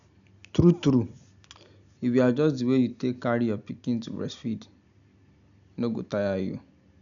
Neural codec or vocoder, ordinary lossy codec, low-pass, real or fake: none; none; 7.2 kHz; real